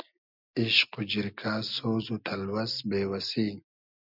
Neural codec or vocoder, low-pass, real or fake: none; 5.4 kHz; real